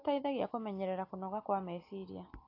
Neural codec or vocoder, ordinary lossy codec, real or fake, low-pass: none; AAC, 32 kbps; real; 5.4 kHz